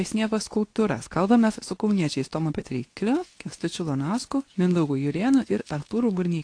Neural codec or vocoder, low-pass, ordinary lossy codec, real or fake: codec, 24 kHz, 0.9 kbps, WavTokenizer, medium speech release version 2; 9.9 kHz; AAC, 48 kbps; fake